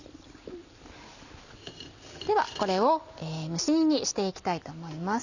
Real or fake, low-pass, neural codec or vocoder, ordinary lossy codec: real; 7.2 kHz; none; none